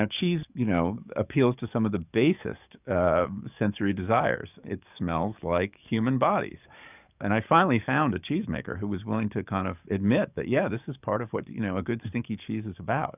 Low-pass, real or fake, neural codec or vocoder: 3.6 kHz; fake; codec, 44.1 kHz, 7.8 kbps, DAC